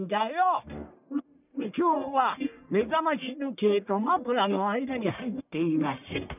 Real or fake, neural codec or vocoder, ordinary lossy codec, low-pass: fake; codec, 44.1 kHz, 1.7 kbps, Pupu-Codec; none; 3.6 kHz